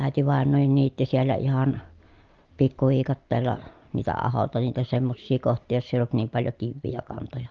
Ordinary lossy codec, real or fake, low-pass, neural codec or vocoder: Opus, 32 kbps; real; 7.2 kHz; none